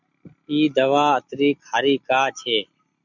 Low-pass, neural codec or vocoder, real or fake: 7.2 kHz; none; real